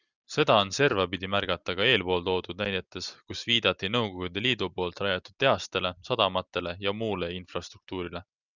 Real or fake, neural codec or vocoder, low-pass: real; none; 7.2 kHz